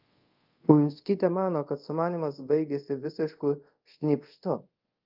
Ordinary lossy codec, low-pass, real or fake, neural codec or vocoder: Opus, 24 kbps; 5.4 kHz; fake; codec, 24 kHz, 0.5 kbps, DualCodec